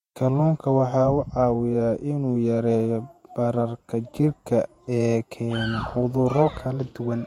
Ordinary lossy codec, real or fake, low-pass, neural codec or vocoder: MP3, 64 kbps; fake; 19.8 kHz; vocoder, 48 kHz, 128 mel bands, Vocos